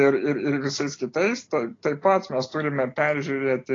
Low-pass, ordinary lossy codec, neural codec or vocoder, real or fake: 7.2 kHz; AAC, 32 kbps; none; real